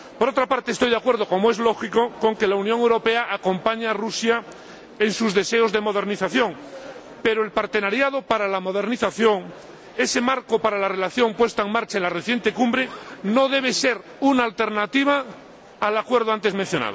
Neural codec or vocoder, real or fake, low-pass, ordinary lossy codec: none; real; none; none